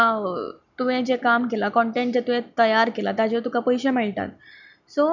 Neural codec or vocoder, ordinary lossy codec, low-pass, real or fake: none; none; 7.2 kHz; real